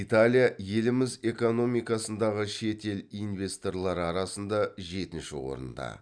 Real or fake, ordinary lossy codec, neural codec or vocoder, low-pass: real; none; none; 9.9 kHz